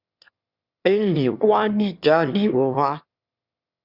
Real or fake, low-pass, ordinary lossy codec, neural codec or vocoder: fake; 5.4 kHz; Opus, 64 kbps; autoencoder, 22.05 kHz, a latent of 192 numbers a frame, VITS, trained on one speaker